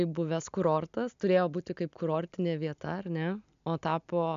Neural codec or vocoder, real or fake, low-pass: none; real; 7.2 kHz